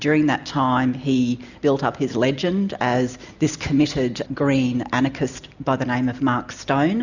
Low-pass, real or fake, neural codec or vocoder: 7.2 kHz; real; none